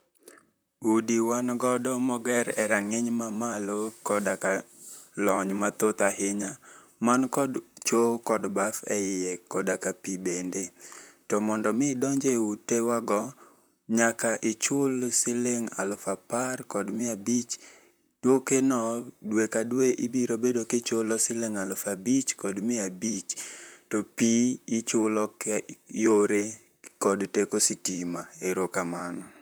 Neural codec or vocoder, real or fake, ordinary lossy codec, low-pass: vocoder, 44.1 kHz, 128 mel bands, Pupu-Vocoder; fake; none; none